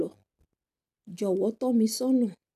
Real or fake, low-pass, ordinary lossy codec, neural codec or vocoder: real; 14.4 kHz; none; none